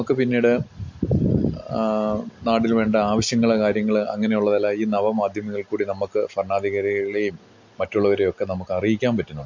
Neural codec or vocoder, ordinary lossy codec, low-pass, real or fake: none; MP3, 48 kbps; 7.2 kHz; real